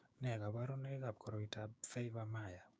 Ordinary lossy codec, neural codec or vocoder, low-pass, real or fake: none; codec, 16 kHz, 6 kbps, DAC; none; fake